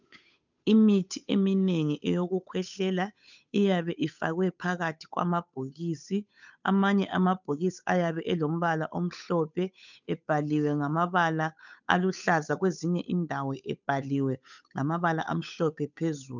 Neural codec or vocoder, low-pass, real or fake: codec, 16 kHz, 8 kbps, FunCodec, trained on Chinese and English, 25 frames a second; 7.2 kHz; fake